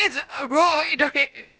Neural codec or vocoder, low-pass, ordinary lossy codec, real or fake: codec, 16 kHz, about 1 kbps, DyCAST, with the encoder's durations; none; none; fake